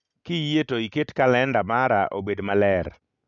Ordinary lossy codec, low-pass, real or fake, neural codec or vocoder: none; 7.2 kHz; real; none